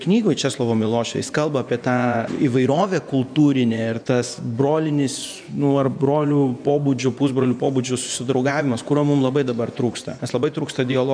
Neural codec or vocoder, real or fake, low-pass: vocoder, 24 kHz, 100 mel bands, Vocos; fake; 9.9 kHz